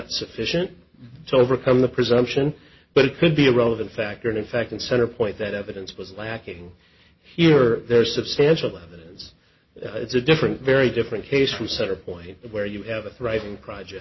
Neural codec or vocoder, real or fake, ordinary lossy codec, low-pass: none; real; MP3, 24 kbps; 7.2 kHz